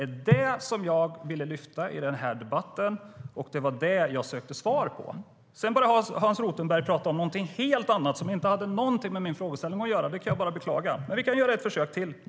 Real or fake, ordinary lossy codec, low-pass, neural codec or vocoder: real; none; none; none